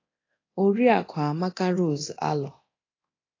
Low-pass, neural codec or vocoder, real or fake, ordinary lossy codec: 7.2 kHz; codec, 24 kHz, 0.9 kbps, DualCodec; fake; AAC, 32 kbps